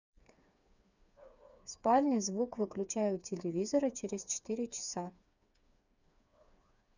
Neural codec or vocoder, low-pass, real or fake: codec, 16 kHz, 4 kbps, FreqCodec, smaller model; 7.2 kHz; fake